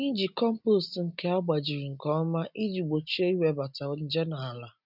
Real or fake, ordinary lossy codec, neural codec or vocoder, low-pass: real; none; none; 5.4 kHz